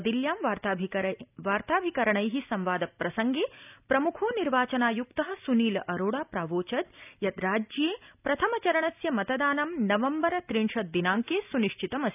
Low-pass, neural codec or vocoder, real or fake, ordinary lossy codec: 3.6 kHz; none; real; none